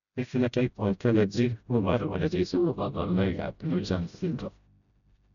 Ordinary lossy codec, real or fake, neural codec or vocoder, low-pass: none; fake; codec, 16 kHz, 0.5 kbps, FreqCodec, smaller model; 7.2 kHz